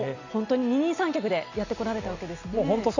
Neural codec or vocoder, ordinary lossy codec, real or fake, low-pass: none; none; real; 7.2 kHz